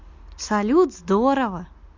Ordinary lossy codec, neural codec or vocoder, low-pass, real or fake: MP3, 48 kbps; none; 7.2 kHz; real